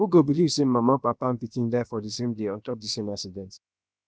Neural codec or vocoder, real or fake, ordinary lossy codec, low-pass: codec, 16 kHz, about 1 kbps, DyCAST, with the encoder's durations; fake; none; none